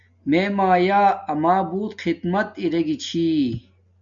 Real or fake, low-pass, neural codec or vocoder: real; 7.2 kHz; none